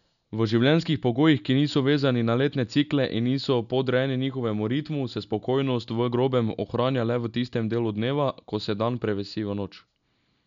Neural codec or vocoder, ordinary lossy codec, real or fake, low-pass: none; none; real; 7.2 kHz